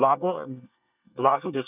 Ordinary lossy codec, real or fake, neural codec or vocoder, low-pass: none; fake; codec, 24 kHz, 1 kbps, SNAC; 3.6 kHz